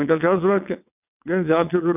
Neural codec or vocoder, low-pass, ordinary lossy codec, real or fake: vocoder, 22.05 kHz, 80 mel bands, WaveNeXt; 3.6 kHz; none; fake